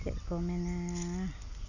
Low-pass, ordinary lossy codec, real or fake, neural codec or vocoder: 7.2 kHz; none; real; none